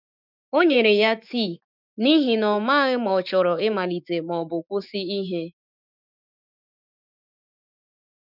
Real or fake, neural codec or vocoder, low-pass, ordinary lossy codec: fake; autoencoder, 48 kHz, 128 numbers a frame, DAC-VAE, trained on Japanese speech; 5.4 kHz; none